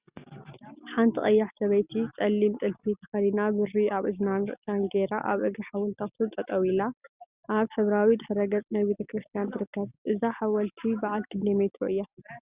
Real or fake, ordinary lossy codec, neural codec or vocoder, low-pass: real; Opus, 64 kbps; none; 3.6 kHz